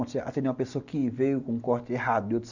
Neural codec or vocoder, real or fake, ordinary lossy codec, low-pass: none; real; none; 7.2 kHz